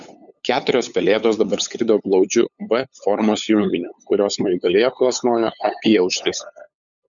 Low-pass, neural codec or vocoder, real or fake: 7.2 kHz; codec, 16 kHz, 8 kbps, FunCodec, trained on LibriTTS, 25 frames a second; fake